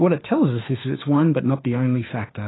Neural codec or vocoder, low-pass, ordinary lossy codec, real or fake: autoencoder, 48 kHz, 32 numbers a frame, DAC-VAE, trained on Japanese speech; 7.2 kHz; AAC, 16 kbps; fake